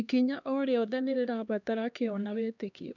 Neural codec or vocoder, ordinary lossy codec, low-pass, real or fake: codec, 16 kHz, 2 kbps, X-Codec, HuBERT features, trained on LibriSpeech; none; 7.2 kHz; fake